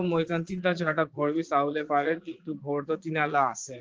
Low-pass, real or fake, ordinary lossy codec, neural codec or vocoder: 7.2 kHz; fake; Opus, 24 kbps; vocoder, 22.05 kHz, 80 mel bands, Vocos